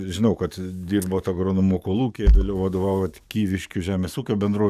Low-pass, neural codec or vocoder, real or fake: 14.4 kHz; codec, 44.1 kHz, 7.8 kbps, DAC; fake